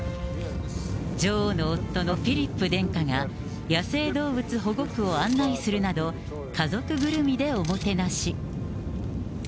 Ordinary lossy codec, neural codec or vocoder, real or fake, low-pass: none; none; real; none